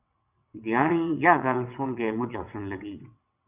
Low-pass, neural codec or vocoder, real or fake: 3.6 kHz; vocoder, 22.05 kHz, 80 mel bands, WaveNeXt; fake